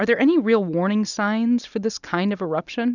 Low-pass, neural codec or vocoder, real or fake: 7.2 kHz; none; real